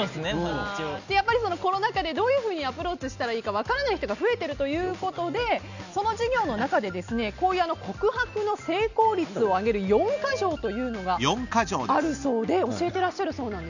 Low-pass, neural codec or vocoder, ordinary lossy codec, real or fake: 7.2 kHz; none; none; real